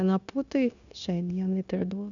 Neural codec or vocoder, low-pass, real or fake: codec, 16 kHz, 0.9 kbps, LongCat-Audio-Codec; 7.2 kHz; fake